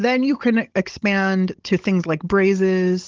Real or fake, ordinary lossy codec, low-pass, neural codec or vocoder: fake; Opus, 16 kbps; 7.2 kHz; codec, 16 kHz, 16 kbps, FunCodec, trained on Chinese and English, 50 frames a second